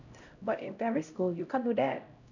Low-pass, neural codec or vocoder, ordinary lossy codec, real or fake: 7.2 kHz; codec, 16 kHz, 0.5 kbps, X-Codec, HuBERT features, trained on LibriSpeech; none; fake